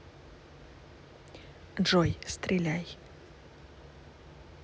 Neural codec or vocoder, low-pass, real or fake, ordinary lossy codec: none; none; real; none